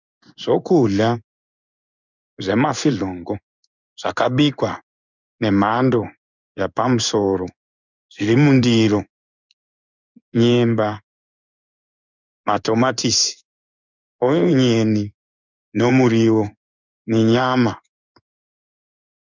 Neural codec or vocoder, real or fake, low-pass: codec, 16 kHz in and 24 kHz out, 1 kbps, XY-Tokenizer; fake; 7.2 kHz